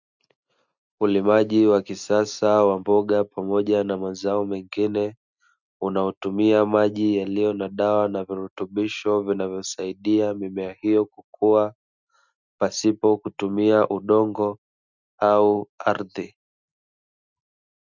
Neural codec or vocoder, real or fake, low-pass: none; real; 7.2 kHz